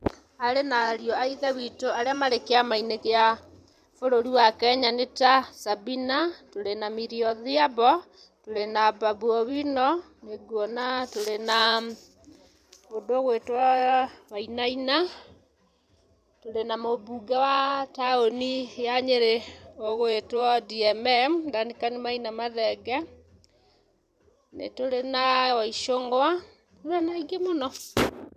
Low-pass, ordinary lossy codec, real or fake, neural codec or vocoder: 14.4 kHz; none; fake; vocoder, 44.1 kHz, 128 mel bands every 512 samples, BigVGAN v2